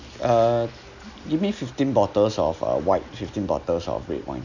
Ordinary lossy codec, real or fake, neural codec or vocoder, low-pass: none; real; none; 7.2 kHz